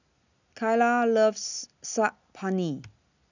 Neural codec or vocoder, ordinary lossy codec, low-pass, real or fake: none; none; 7.2 kHz; real